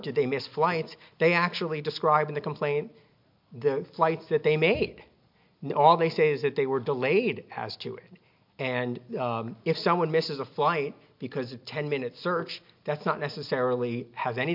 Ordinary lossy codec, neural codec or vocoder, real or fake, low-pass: MP3, 48 kbps; none; real; 5.4 kHz